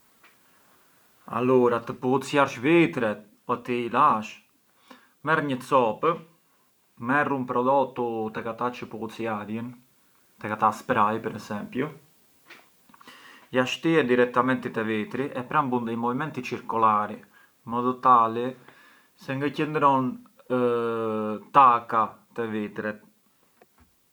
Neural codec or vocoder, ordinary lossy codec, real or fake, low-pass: none; none; real; none